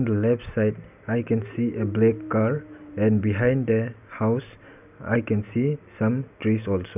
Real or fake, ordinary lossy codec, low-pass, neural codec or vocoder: real; none; 3.6 kHz; none